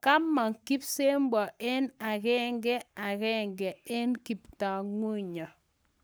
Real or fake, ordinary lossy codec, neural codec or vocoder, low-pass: fake; none; codec, 44.1 kHz, 7.8 kbps, Pupu-Codec; none